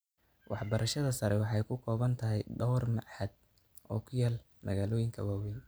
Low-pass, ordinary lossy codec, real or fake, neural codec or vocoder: none; none; real; none